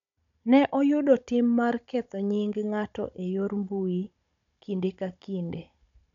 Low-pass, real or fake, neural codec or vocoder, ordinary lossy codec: 7.2 kHz; fake; codec, 16 kHz, 16 kbps, FunCodec, trained on Chinese and English, 50 frames a second; none